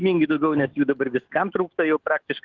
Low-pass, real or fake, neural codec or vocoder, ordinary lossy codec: 7.2 kHz; fake; autoencoder, 48 kHz, 128 numbers a frame, DAC-VAE, trained on Japanese speech; Opus, 16 kbps